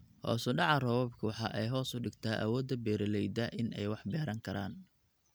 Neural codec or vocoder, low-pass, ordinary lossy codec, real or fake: none; none; none; real